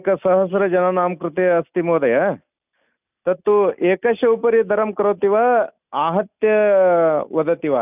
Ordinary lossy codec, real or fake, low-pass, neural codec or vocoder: none; real; 3.6 kHz; none